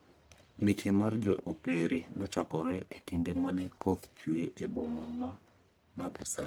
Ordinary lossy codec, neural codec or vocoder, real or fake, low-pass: none; codec, 44.1 kHz, 1.7 kbps, Pupu-Codec; fake; none